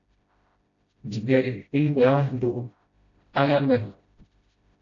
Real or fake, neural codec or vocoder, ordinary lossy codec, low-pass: fake; codec, 16 kHz, 0.5 kbps, FreqCodec, smaller model; AAC, 48 kbps; 7.2 kHz